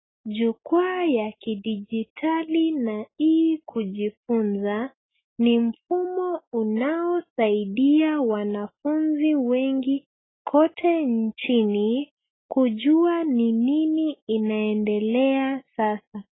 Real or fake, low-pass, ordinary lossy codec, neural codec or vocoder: real; 7.2 kHz; AAC, 16 kbps; none